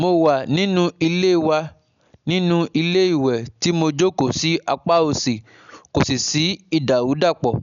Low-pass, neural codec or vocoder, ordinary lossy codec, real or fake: 7.2 kHz; none; none; real